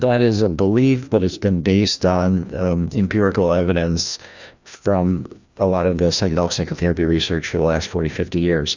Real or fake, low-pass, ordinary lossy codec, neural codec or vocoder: fake; 7.2 kHz; Opus, 64 kbps; codec, 16 kHz, 1 kbps, FreqCodec, larger model